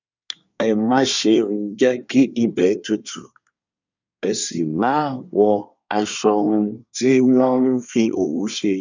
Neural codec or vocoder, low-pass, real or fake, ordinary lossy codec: codec, 24 kHz, 1 kbps, SNAC; 7.2 kHz; fake; none